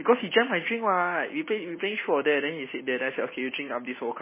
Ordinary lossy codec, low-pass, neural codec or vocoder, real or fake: MP3, 16 kbps; 3.6 kHz; none; real